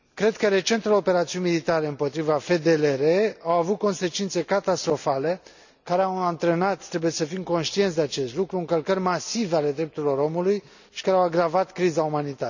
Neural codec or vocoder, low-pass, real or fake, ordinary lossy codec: none; 7.2 kHz; real; none